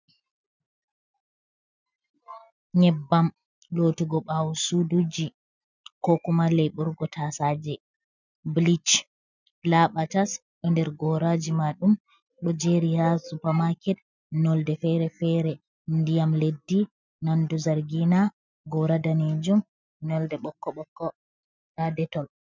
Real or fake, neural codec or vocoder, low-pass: real; none; 7.2 kHz